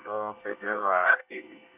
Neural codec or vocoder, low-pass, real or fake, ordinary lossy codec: codec, 24 kHz, 1 kbps, SNAC; 3.6 kHz; fake; none